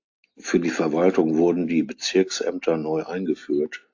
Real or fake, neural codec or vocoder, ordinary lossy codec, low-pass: real; none; AAC, 48 kbps; 7.2 kHz